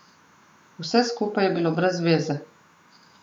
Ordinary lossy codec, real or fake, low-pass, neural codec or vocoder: none; fake; 19.8 kHz; autoencoder, 48 kHz, 128 numbers a frame, DAC-VAE, trained on Japanese speech